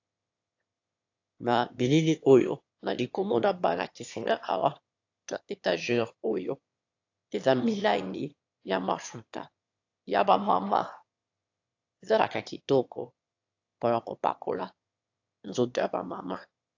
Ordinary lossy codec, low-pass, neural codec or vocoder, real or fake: AAC, 48 kbps; 7.2 kHz; autoencoder, 22.05 kHz, a latent of 192 numbers a frame, VITS, trained on one speaker; fake